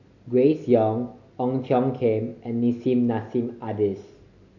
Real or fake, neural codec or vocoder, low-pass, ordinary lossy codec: real; none; 7.2 kHz; none